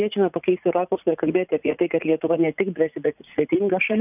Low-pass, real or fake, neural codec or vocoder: 3.6 kHz; fake; vocoder, 44.1 kHz, 80 mel bands, Vocos